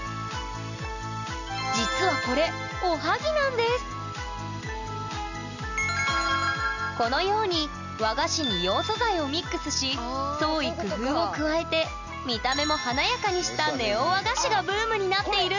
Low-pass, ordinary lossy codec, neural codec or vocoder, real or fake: 7.2 kHz; none; none; real